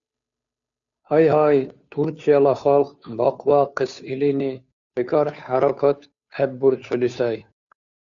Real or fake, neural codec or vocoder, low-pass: fake; codec, 16 kHz, 2 kbps, FunCodec, trained on Chinese and English, 25 frames a second; 7.2 kHz